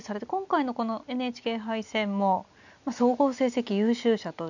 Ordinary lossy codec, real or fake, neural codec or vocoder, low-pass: none; real; none; 7.2 kHz